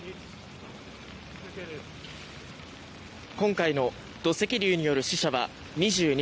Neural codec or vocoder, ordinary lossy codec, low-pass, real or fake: none; none; none; real